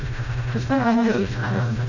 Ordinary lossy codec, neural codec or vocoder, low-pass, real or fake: none; codec, 16 kHz, 0.5 kbps, FreqCodec, smaller model; 7.2 kHz; fake